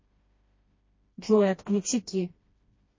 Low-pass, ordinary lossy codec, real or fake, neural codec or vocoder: 7.2 kHz; MP3, 32 kbps; fake; codec, 16 kHz, 1 kbps, FreqCodec, smaller model